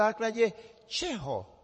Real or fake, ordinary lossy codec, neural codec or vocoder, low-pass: fake; MP3, 32 kbps; vocoder, 24 kHz, 100 mel bands, Vocos; 10.8 kHz